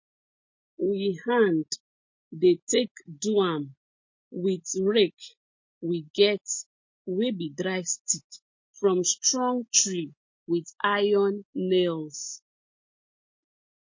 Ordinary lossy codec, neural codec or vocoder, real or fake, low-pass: MP3, 32 kbps; none; real; 7.2 kHz